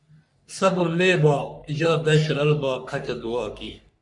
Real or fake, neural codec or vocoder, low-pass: fake; codec, 44.1 kHz, 3.4 kbps, Pupu-Codec; 10.8 kHz